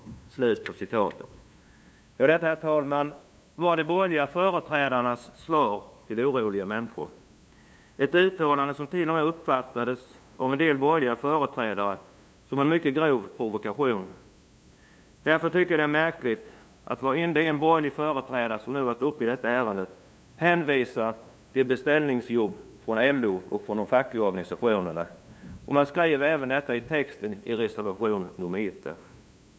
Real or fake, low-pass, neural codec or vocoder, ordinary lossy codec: fake; none; codec, 16 kHz, 2 kbps, FunCodec, trained on LibriTTS, 25 frames a second; none